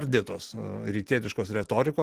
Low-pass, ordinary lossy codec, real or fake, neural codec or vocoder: 14.4 kHz; Opus, 16 kbps; real; none